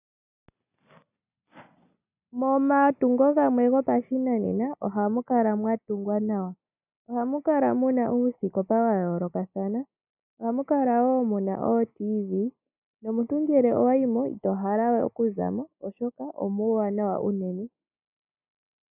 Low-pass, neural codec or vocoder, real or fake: 3.6 kHz; none; real